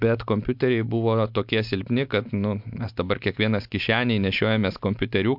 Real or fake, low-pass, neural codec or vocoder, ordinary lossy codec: real; 5.4 kHz; none; MP3, 48 kbps